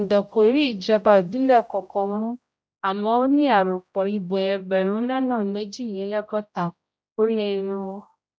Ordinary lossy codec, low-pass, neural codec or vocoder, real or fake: none; none; codec, 16 kHz, 0.5 kbps, X-Codec, HuBERT features, trained on general audio; fake